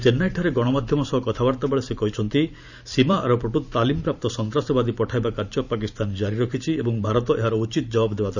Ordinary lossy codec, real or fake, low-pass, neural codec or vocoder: none; fake; 7.2 kHz; vocoder, 44.1 kHz, 128 mel bands every 512 samples, BigVGAN v2